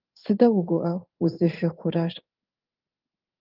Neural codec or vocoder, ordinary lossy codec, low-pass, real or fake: codec, 16 kHz in and 24 kHz out, 1 kbps, XY-Tokenizer; Opus, 24 kbps; 5.4 kHz; fake